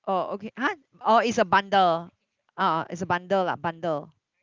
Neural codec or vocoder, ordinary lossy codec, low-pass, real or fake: none; Opus, 24 kbps; 7.2 kHz; real